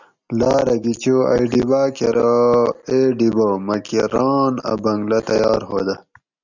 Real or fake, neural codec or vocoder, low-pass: real; none; 7.2 kHz